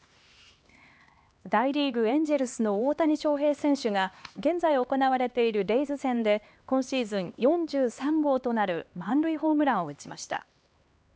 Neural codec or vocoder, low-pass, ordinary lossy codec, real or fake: codec, 16 kHz, 2 kbps, X-Codec, HuBERT features, trained on LibriSpeech; none; none; fake